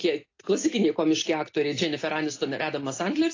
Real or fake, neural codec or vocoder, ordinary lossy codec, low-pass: real; none; AAC, 32 kbps; 7.2 kHz